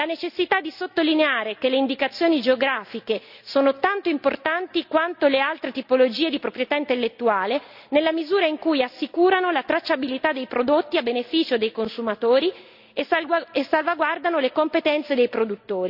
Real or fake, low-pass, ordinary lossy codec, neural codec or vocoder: real; 5.4 kHz; none; none